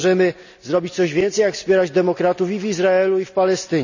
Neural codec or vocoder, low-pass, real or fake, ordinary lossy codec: none; 7.2 kHz; real; none